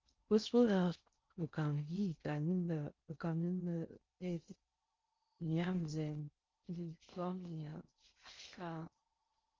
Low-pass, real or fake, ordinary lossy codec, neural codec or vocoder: 7.2 kHz; fake; Opus, 32 kbps; codec, 16 kHz in and 24 kHz out, 0.6 kbps, FocalCodec, streaming, 2048 codes